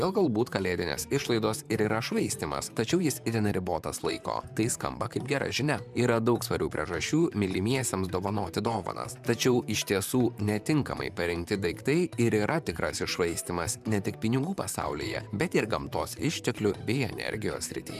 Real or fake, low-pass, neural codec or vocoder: fake; 14.4 kHz; vocoder, 44.1 kHz, 128 mel bands, Pupu-Vocoder